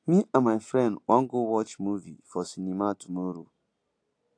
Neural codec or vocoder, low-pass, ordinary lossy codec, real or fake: none; 9.9 kHz; AAC, 48 kbps; real